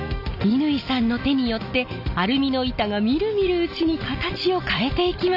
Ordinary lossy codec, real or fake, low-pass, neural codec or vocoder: none; real; 5.4 kHz; none